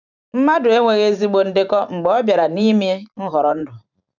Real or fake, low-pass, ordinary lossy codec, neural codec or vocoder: fake; 7.2 kHz; none; vocoder, 44.1 kHz, 128 mel bands every 512 samples, BigVGAN v2